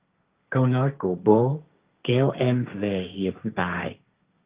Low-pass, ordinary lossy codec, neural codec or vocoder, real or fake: 3.6 kHz; Opus, 32 kbps; codec, 16 kHz, 1.1 kbps, Voila-Tokenizer; fake